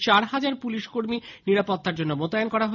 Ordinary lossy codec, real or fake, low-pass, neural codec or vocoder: none; real; 7.2 kHz; none